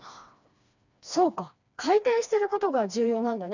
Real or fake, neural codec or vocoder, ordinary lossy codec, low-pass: fake; codec, 16 kHz, 2 kbps, FreqCodec, smaller model; none; 7.2 kHz